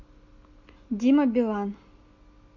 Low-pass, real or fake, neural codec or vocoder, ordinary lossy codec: 7.2 kHz; real; none; AAC, 48 kbps